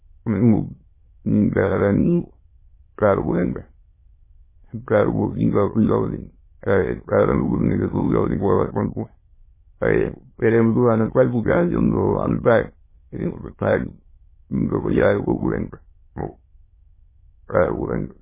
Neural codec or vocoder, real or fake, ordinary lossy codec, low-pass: autoencoder, 22.05 kHz, a latent of 192 numbers a frame, VITS, trained on many speakers; fake; MP3, 16 kbps; 3.6 kHz